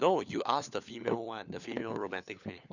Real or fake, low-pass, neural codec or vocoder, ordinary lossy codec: fake; 7.2 kHz; codec, 16 kHz, 4 kbps, FunCodec, trained on LibriTTS, 50 frames a second; none